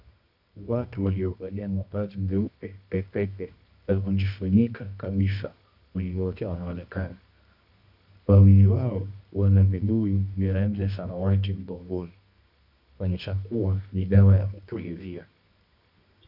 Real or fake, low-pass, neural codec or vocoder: fake; 5.4 kHz; codec, 24 kHz, 0.9 kbps, WavTokenizer, medium music audio release